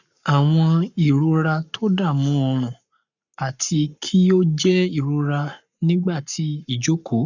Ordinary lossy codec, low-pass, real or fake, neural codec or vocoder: none; 7.2 kHz; fake; codec, 44.1 kHz, 7.8 kbps, Pupu-Codec